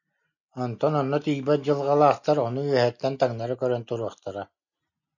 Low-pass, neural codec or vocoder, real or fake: 7.2 kHz; none; real